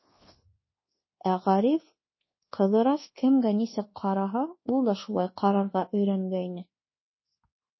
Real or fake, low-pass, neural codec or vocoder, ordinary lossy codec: fake; 7.2 kHz; codec, 24 kHz, 1.2 kbps, DualCodec; MP3, 24 kbps